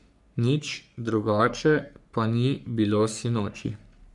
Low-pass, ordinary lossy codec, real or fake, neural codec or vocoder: 10.8 kHz; none; fake; codec, 44.1 kHz, 3.4 kbps, Pupu-Codec